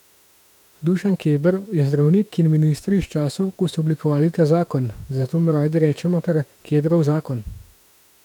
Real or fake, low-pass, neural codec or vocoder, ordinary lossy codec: fake; 19.8 kHz; autoencoder, 48 kHz, 32 numbers a frame, DAC-VAE, trained on Japanese speech; none